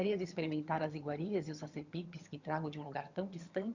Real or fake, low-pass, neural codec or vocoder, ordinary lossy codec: fake; 7.2 kHz; vocoder, 22.05 kHz, 80 mel bands, HiFi-GAN; Opus, 64 kbps